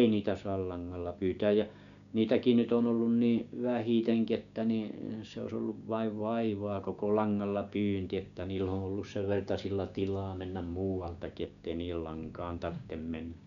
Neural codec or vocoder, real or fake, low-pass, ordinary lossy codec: codec, 16 kHz, 6 kbps, DAC; fake; 7.2 kHz; none